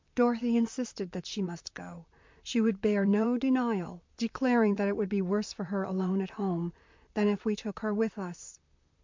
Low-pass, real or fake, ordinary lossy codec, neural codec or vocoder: 7.2 kHz; fake; MP3, 64 kbps; vocoder, 44.1 kHz, 128 mel bands, Pupu-Vocoder